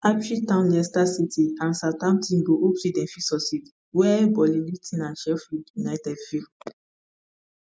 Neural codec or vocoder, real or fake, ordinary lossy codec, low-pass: none; real; none; none